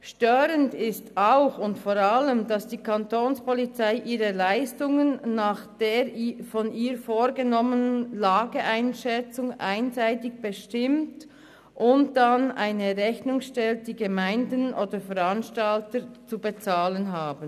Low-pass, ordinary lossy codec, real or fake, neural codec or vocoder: 14.4 kHz; none; real; none